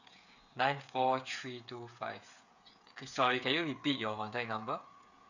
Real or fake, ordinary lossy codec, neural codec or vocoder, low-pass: fake; none; codec, 16 kHz, 8 kbps, FreqCodec, smaller model; 7.2 kHz